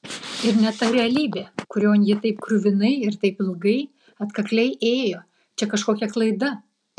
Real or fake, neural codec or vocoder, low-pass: real; none; 9.9 kHz